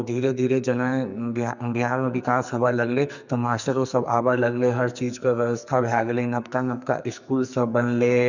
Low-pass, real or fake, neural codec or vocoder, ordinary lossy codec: 7.2 kHz; fake; codec, 44.1 kHz, 2.6 kbps, SNAC; none